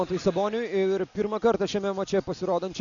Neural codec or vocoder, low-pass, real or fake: none; 7.2 kHz; real